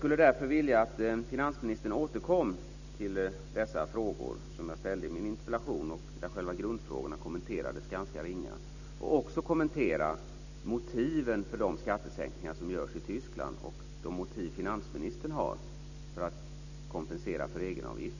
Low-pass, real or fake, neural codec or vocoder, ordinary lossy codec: 7.2 kHz; real; none; none